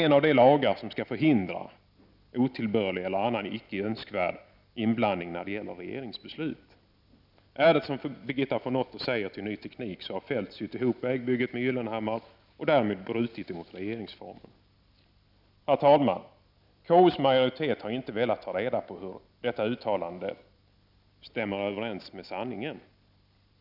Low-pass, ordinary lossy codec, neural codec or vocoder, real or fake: 5.4 kHz; none; none; real